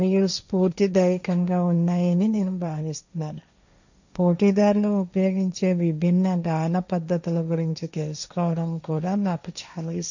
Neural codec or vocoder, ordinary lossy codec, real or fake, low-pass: codec, 16 kHz, 1.1 kbps, Voila-Tokenizer; none; fake; 7.2 kHz